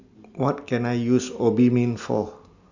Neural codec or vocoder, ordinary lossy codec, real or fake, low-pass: none; Opus, 64 kbps; real; 7.2 kHz